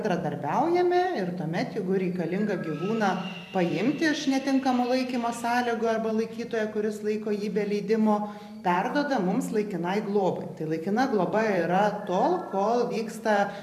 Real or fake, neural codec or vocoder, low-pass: fake; vocoder, 44.1 kHz, 128 mel bands every 512 samples, BigVGAN v2; 14.4 kHz